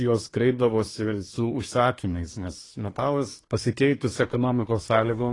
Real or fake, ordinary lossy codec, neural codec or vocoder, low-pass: fake; AAC, 32 kbps; codec, 24 kHz, 1 kbps, SNAC; 10.8 kHz